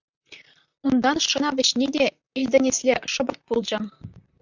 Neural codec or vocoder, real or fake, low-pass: vocoder, 44.1 kHz, 128 mel bands, Pupu-Vocoder; fake; 7.2 kHz